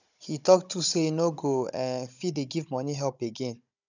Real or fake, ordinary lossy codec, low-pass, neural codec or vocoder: fake; none; 7.2 kHz; codec, 16 kHz, 16 kbps, FunCodec, trained on Chinese and English, 50 frames a second